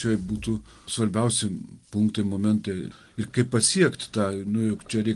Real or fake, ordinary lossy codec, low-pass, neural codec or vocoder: real; Opus, 24 kbps; 10.8 kHz; none